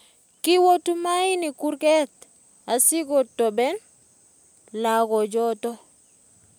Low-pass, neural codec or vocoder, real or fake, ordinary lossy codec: none; none; real; none